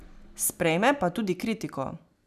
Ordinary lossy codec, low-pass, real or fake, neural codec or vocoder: none; 14.4 kHz; real; none